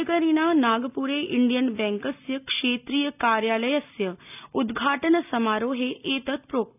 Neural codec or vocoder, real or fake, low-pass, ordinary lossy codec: none; real; 3.6 kHz; none